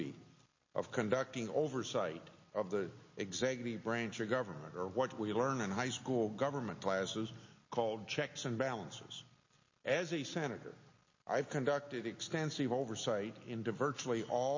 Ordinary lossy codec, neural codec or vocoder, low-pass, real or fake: MP3, 32 kbps; none; 7.2 kHz; real